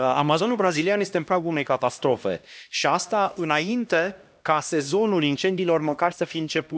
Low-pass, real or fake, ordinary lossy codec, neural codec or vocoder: none; fake; none; codec, 16 kHz, 1 kbps, X-Codec, HuBERT features, trained on LibriSpeech